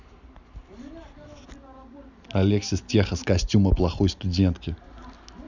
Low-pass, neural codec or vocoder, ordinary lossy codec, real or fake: 7.2 kHz; none; none; real